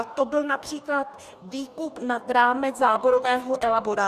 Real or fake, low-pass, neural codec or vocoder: fake; 14.4 kHz; codec, 44.1 kHz, 2.6 kbps, DAC